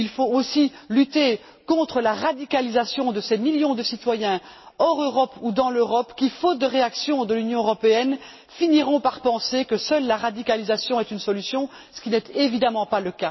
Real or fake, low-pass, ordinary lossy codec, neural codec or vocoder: real; 7.2 kHz; MP3, 24 kbps; none